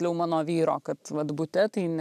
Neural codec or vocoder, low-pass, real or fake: none; 14.4 kHz; real